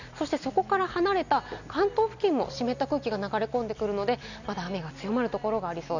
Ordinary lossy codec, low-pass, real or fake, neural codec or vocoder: none; 7.2 kHz; real; none